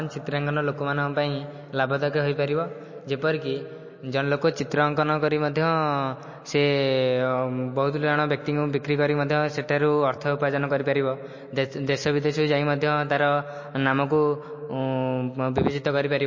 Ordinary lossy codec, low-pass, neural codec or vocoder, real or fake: MP3, 32 kbps; 7.2 kHz; none; real